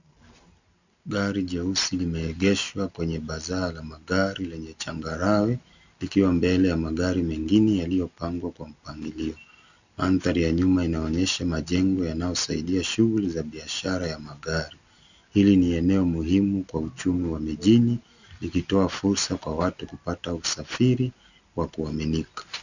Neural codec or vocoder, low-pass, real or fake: none; 7.2 kHz; real